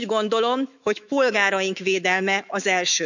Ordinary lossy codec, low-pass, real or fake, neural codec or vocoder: none; 7.2 kHz; fake; autoencoder, 48 kHz, 128 numbers a frame, DAC-VAE, trained on Japanese speech